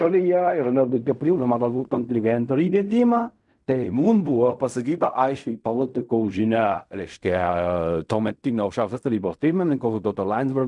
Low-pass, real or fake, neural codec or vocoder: 10.8 kHz; fake; codec, 16 kHz in and 24 kHz out, 0.4 kbps, LongCat-Audio-Codec, fine tuned four codebook decoder